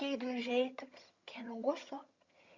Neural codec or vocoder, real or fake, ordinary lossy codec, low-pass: vocoder, 22.05 kHz, 80 mel bands, HiFi-GAN; fake; Opus, 64 kbps; 7.2 kHz